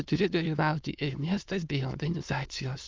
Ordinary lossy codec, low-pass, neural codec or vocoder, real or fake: Opus, 24 kbps; 7.2 kHz; autoencoder, 22.05 kHz, a latent of 192 numbers a frame, VITS, trained on many speakers; fake